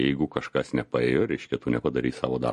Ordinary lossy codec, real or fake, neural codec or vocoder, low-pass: MP3, 48 kbps; real; none; 14.4 kHz